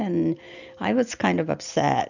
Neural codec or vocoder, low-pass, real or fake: none; 7.2 kHz; real